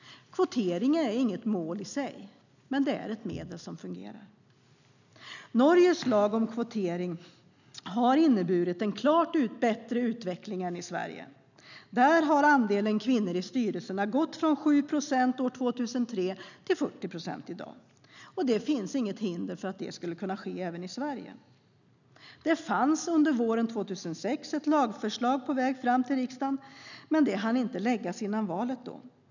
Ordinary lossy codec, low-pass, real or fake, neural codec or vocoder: none; 7.2 kHz; real; none